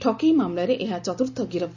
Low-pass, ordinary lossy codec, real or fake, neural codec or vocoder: 7.2 kHz; none; real; none